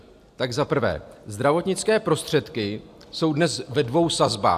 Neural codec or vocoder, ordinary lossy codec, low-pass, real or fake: none; AAC, 96 kbps; 14.4 kHz; real